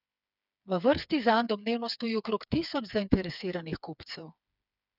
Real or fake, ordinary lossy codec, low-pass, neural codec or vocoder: fake; none; 5.4 kHz; codec, 16 kHz, 4 kbps, FreqCodec, smaller model